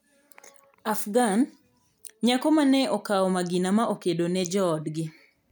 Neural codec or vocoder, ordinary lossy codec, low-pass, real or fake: none; none; none; real